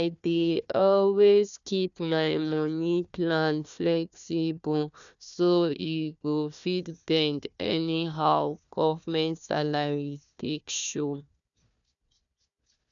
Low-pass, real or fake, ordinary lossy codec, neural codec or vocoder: 7.2 kHz; fake; none; codec, 16 kHz, 1 kbps, FunCodec, trained on Chinese and English, 50 frames a second